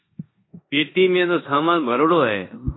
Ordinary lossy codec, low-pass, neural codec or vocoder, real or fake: AAC, 16 kbps; 7.2 kHz; codec, 16 kHz in and 24 kHz out, 0.9 kbps, LongCat-Audio-Codec, four codebook decoder; fake